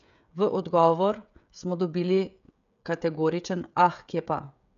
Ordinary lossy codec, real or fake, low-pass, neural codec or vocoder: none; fake; 7.2 kHz; codec, 16 kHz, 16 kbps, FreqCodec, smaller model